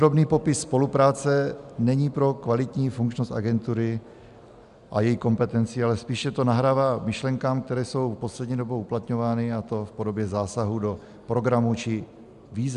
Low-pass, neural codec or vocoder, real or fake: 10.8 kHz; none; real